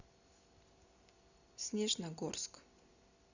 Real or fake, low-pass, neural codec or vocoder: real; 7.2 kHz; none